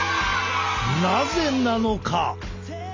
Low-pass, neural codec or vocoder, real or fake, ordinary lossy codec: 7.2 kHz; none; real; MP3, 48 kbps